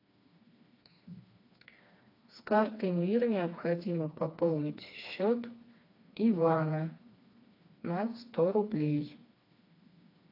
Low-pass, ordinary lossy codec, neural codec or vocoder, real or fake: 5.4 kHz; AAC, 32 kbps; codec, 16 kHz, 2 kbps, FreqCodec, smaller model; fake